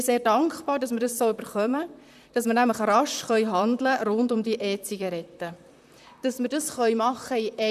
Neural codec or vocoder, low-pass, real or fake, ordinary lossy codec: vocoder, 44.1 kHz, 128 mel bands, Pupu-Vocoder; 14.4 kHz; fake; none